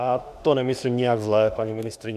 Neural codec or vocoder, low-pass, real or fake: autoencoder, 48 kHz, 32 numbers a frame, DAC-VAE, trained on Japanese speech; 14.4 kHz; fake